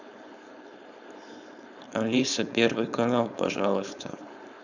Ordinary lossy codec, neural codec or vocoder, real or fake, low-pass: none; codec, 16 kHz, 4.8 kbps, FACodec; fake; 7.2 kHz